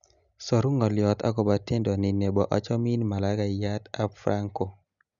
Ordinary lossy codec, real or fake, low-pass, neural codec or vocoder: none; real; 7.2 kHz; none